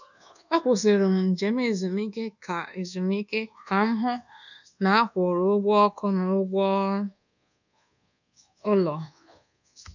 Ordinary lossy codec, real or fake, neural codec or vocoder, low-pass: none; fake; codec, 24 kHz, 1.2 kbps, DualCodec; 7.2 kHz